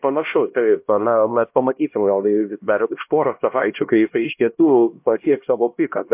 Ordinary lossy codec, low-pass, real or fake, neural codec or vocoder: MP3, 32 kbps; 3.6 kHz; fake; codec, 16 kHz, 1 kbps, X-Codec, HuBERT features, trained on LibriSpeech